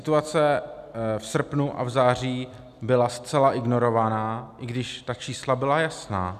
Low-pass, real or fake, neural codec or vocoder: 14.4 kHz; real; none